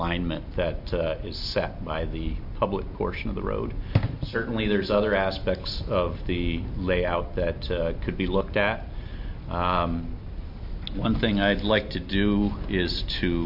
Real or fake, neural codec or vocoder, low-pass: real; none; 5.4 kHz